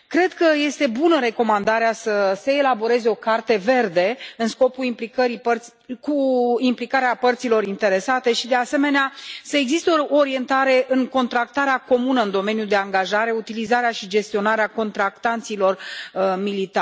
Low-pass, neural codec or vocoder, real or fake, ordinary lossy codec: none; none; real; none